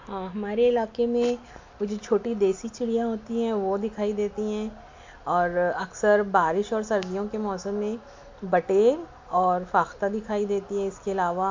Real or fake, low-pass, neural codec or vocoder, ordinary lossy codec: real; 7.2 kHz; none; MP3, 48 kbps